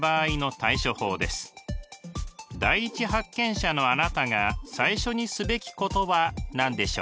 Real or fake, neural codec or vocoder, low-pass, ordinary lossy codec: real; none; none; none